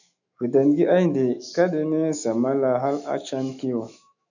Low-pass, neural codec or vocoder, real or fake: 7.2 kHz; autoencoder, 48 kHz, 128 numbers a frame, DAC-VAE, trained on Japanese speech; fake